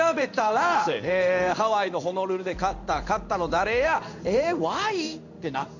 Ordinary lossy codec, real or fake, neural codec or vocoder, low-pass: none; fake; codec, 16 kHz in and 24 kHz out, 1 kbps, XY-Tokenizer; 7.2 kHz